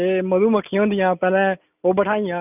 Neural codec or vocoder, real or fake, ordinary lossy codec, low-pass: none; real; none; 3.6 kHz